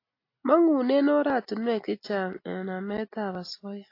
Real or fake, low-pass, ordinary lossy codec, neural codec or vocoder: real; 5.4 kHz; AAC, 32 kbps; none